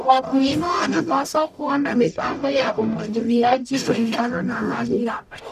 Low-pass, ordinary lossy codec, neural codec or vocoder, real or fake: 14.4 kHz; none; codec, 44.1 kHz, 0.9 kbps, DAC; fake